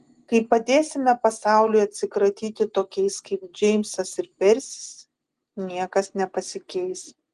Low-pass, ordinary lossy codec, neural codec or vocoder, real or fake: 10.8 kHz; Opus, 24 kbps; none; real